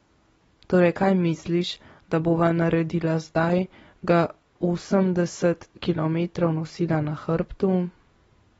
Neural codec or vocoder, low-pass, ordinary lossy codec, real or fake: none; 10.8 kHz; AAC, 24 kbps; real